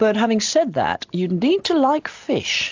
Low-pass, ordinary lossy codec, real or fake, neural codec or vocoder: 7.2 kHz; AAC, 48 kbps; real; none